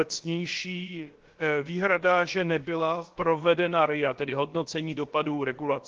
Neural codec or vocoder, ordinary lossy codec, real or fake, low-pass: codec, 16 kHz, about 1 kbps, DyCAST, with the encoder's durations; Opus, 16 kbps; fake; 7.2 kHz